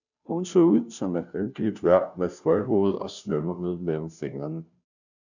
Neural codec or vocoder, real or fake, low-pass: codec, 16 kHz, 0.5 kbps, FunCodec, trained on Chinese and English, 25 frames a second; fake; 7.2 kHz